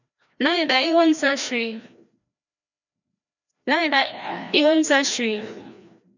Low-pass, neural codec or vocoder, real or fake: 7.2 kHz; codec, 16 kHz, 1 kbps, FreqCodec, larger model; fake